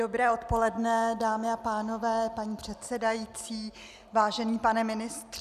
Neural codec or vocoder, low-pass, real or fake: none; 14.4 kHz; real